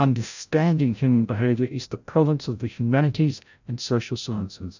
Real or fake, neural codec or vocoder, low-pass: fake; codec, 16 kHz, 0.5 kbps, FreqCodec, larger model; 7.2 kHz